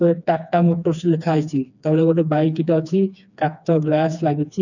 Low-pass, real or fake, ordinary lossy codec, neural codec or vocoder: 7.2 kHz; fake; none; codec, 16 kHz, 2 kbps, FreqCodec, smaller model